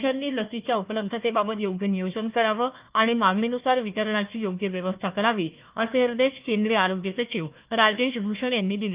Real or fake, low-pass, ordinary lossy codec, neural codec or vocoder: fake; 3.6 kHz; Opus, 32 kbps; codec, 16 kHz, 1 kbps, FunCodec, trained on Chinese and English, 50 frames a second